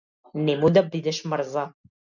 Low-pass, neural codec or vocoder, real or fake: 7.2 kHz; none; real